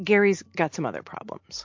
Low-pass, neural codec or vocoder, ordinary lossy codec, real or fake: 7.2 kHz; none; MP3, 48 kbps; real